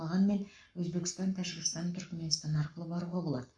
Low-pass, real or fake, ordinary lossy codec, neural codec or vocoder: 9.9 kHz; fake; none; codec, 44.1 kHz, 7.8 kbps, Pupu-Codec